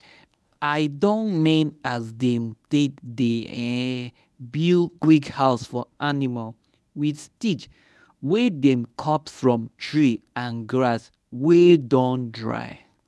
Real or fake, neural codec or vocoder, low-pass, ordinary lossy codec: fake; codec, 24 kHz, 0.9 kbps, WavTokenizer, medium speech release version 1; none; none